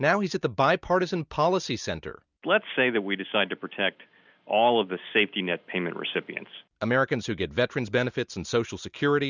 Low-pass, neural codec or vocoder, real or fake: 7.2 kHz; none; real